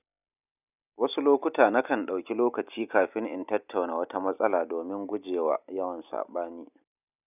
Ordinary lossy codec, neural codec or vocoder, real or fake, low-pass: none; none; real; 3.6 kHz